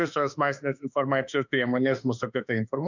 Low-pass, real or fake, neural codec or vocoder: 7.2 kHz; fake; autoencoder, 48 kHz, 32 numbers a frame, DAC-VAE, trained on Japanese speech